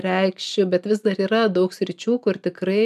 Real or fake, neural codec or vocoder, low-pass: real; none; 14.4 kHz